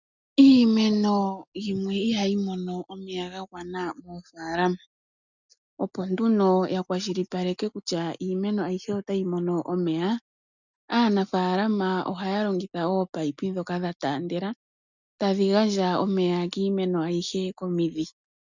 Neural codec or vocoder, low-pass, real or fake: none; 7.2 kHz; real